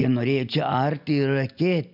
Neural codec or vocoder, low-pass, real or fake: none; 5.4 kHz; real